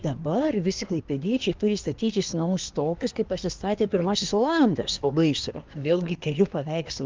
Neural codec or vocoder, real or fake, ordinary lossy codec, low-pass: codec, 24 kHz, 1 kbps, SNAC; fake; Opus, 24 kbps; 7.2 kHz